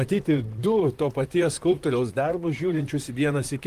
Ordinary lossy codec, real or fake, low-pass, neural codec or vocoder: Opus, 24 kbps; fake; 14.4 kHz; vocoder, 44.1 kHz, 128 mel bands, Pupu-Vocoder